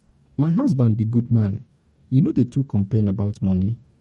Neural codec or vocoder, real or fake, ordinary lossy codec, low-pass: codec, 44.1 kHz, 2.6 kbps, DAC; fake; MP3, 48 kbps; 19.8 kHz